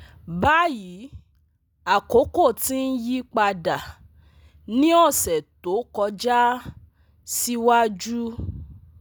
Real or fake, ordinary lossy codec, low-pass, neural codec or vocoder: real; none; none; none